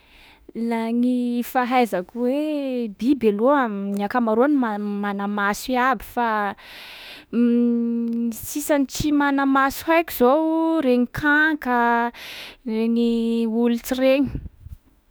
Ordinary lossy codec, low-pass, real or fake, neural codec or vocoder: none; none; fake; autoencoder, 48 kHz, 32 numbers a frame, DAC-VAE, trained on Japanese speech